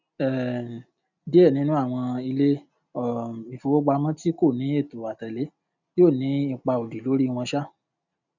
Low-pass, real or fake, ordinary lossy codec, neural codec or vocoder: 7.2 kHz; real; none; none